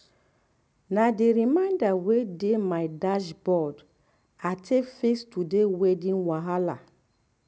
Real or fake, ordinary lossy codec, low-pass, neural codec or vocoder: real; none; none; none